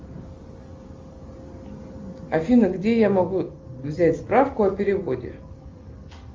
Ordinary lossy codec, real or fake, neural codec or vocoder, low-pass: Opus, 32 kbps; real; none; 7.2 kHz